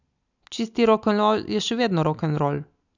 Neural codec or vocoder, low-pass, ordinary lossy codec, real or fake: none; 7.2 kHz; none; real